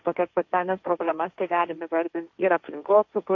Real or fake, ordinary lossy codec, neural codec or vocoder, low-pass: fake; AAC, 48 kbps; codec, 16 kHz, 1.1 kbps, Voila-Tokenizer; 7.2 kHz